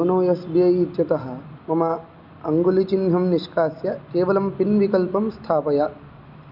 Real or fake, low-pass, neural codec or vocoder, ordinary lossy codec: real; 5.4 kHz; none; Opus, 64 kbps